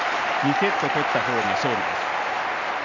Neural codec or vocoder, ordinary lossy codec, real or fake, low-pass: none; none; real; 7.2 kHz